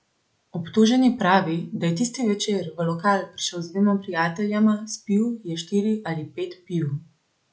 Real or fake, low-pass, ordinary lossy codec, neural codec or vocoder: real; none; none; none